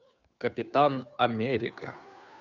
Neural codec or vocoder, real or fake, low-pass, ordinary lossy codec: codec, 16 kHz, 2 kbps, FunCodec, trained on Chinese and English, 25 frames a second; fake; 7.2 kHz; none